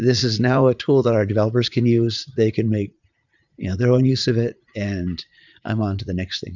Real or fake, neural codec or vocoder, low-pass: fake; vocoder, 44.1 kHz, 80 mel bands, Vocos; 7.2 kHz